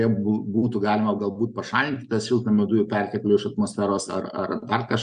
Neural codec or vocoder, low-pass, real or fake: none; 9.9 kHz; real